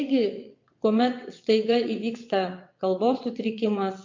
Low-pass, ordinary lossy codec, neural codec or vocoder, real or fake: 7.2 kHz; MP3, 48 kbps; vocoder, 22.05 kHz, 80 mel bands, WaveNeXt; fake